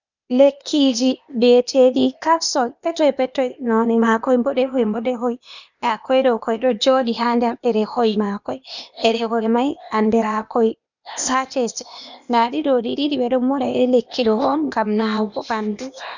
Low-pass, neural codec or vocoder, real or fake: 7.2 kHz; codec, 16 kHz, 0.8 kbps, ZipCodec; fake